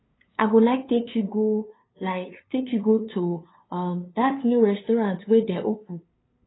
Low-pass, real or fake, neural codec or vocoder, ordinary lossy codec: 7.2 kHz; fake; codec, 16 kHz, 2 kbps, FunCodec, trained on LibriTTS, 25 frames a second; AAC, 16 kbps